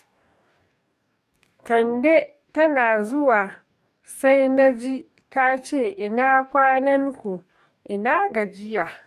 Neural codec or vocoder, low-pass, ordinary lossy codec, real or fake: codec, 44.1 kHz, 2.6 kbps, DAC; 14.4 kHz; none; fake